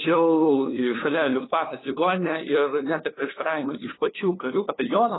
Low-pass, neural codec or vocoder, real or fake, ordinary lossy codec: 7.2 kHz; codec, 16 kHz, 2 kbps, FunCodec, trained on LibriTTS, 25 frames a second; fake; AAC, 16 kbps